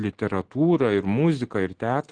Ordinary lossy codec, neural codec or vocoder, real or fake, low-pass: Opus, 16 kbps; none; real; 9.9 kHz